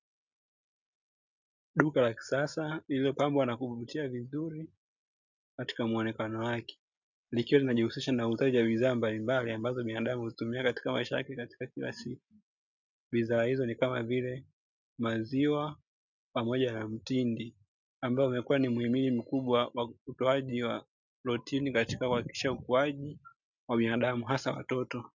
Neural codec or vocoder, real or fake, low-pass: none; real; 7.2 kHz